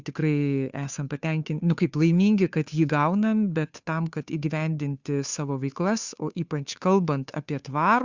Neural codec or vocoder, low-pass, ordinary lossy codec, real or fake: codec, 16 kHz, 2 kbps, FunCodec, trained on Chinese and English, 25 frames a second; 7.2 kHz; Opus, 64 kbps; fake